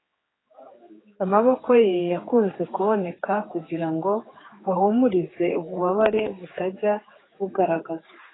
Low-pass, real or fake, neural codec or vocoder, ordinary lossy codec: 7.2 kHz; fake; codec, 16 kHz, 4 kbps, X-Codec, HuBERT features, trained on general audio; AAC, 16 kbps